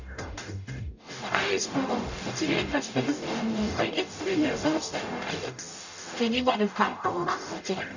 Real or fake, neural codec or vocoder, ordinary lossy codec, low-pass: fake; codec, 44.1 kHz, 0.9 kbps, DAC; none; 7.2 kHz